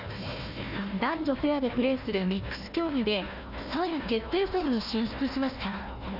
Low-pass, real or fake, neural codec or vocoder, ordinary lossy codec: 5.4 kHz; fake; codec, 16 kHz, 1 kbps, FunCodec, trained on Chinese and English, 50 frames a second; none